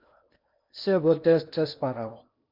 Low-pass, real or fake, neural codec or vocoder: 5.4 kHz; fake; codec, 16 kHz in and 24 kHz out, 0.8 kbps, FocalCodec, streaming, 65536 codes